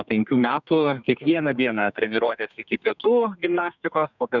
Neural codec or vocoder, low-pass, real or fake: codec, 32 kHz, 1.9 kbps, SNAC; 7.2 kHz; fake